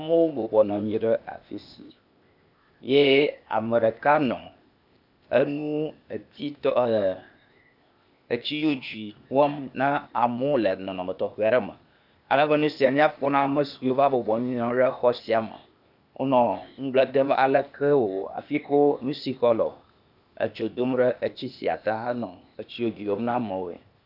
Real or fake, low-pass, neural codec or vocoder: fake; 5.4 kHz; codec, 16 kHz, 0.8 kbps, ZipCodec